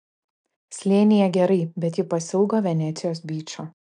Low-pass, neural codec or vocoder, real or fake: 9.9 kHz; none; real